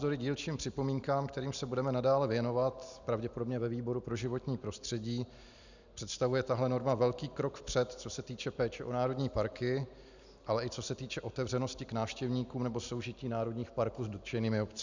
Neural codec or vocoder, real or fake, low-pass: none; real; 7.2 kHz